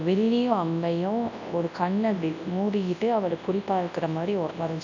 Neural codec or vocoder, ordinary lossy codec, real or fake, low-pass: codec, 24 kHz, 0.9 kbps, WavTokenizer, large speech release; none; fake; 7.2 kHz